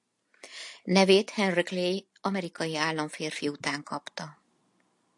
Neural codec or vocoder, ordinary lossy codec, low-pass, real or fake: none; AAC, 64 kbps; 10.8 kHz; real